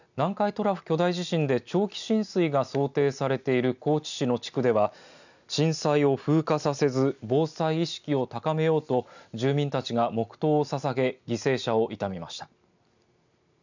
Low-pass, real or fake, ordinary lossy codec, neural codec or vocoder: 7.2 kHz; real; none; none